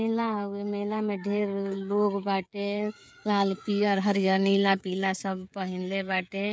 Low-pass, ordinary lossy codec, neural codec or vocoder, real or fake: none; none; codec, 16 kHz, 16 kbps, FreqCodec, smaller model; fake